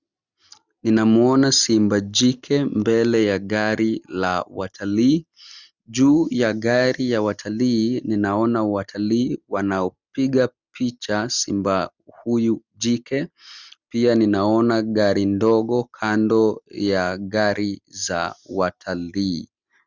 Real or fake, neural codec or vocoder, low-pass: real; none; 7.2 kHz